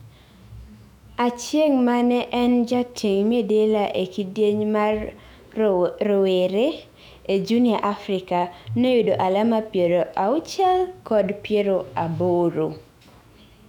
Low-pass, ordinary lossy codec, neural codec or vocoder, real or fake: 19.8 kHz; none; autoencoder, 48 kHz, 128 numbers a frame, DAC-VAE, trained on Japanese speech; fake